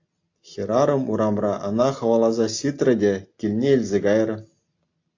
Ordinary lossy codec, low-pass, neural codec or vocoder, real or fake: AAC, 48 kbps; 7.2 kHz; none; real